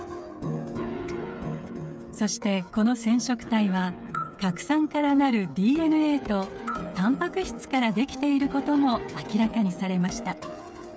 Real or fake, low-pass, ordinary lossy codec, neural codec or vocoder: fake; none; none; codec, 16 kHz, 8 kbps, FreqCodec, smaller model